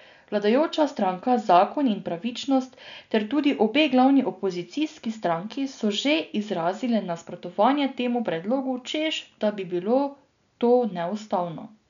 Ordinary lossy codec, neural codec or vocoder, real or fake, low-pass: none; none; real; 7.2 kHz